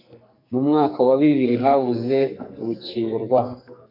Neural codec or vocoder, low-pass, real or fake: codec, 44.1 kHz, 2.6 kbps, SNAC; 5.4 kHz; fake